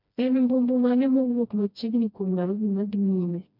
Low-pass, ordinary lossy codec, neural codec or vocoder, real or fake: 5.4 kHz; MP3, 48 kbps; codec, 16 kHz, 1 kbps, FreqCodec, smaller model; fake